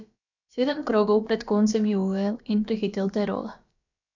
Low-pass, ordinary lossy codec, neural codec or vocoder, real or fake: 7.2 kHz; none; codec, 16 kHz, about 1 kbps, DyCAST, with the encoder's durations; fake